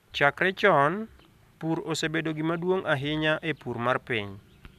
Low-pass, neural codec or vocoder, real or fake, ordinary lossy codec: 14.4 kHz; none; real; none